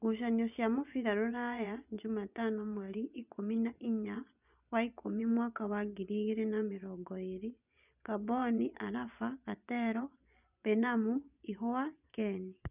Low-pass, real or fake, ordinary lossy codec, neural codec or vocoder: 3.6 kHz; fake; none; vocoder, 24 kHz, 100 mel bands, Vocos